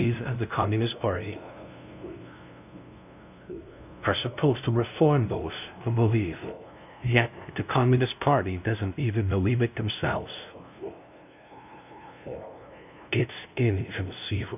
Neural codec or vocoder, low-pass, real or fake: codec, 16 kHz, 0.5 kbps, FunCodec, trained on LibriTTS, 25 frames a second; 3.6 kHz; fake